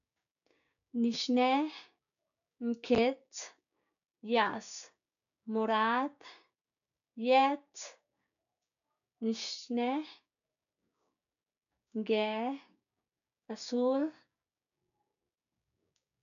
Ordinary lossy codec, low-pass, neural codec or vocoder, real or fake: none; 7.2 kHz; codec, 16 kHz, 6 kbps, DAC; fake